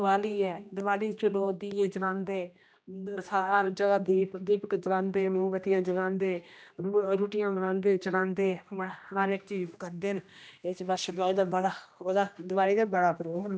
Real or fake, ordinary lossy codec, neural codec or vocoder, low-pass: fake; none; codec, 16 kHz, 1 kbps, X-Codec, HuBERT features, trained on general audio; none